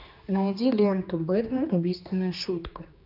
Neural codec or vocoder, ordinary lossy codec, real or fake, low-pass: codec, 16 kHz, 2 kbps, X-Codec, HuBERT features, trained on general audio; AAC, 48 kbps; fake; 5.4 kHz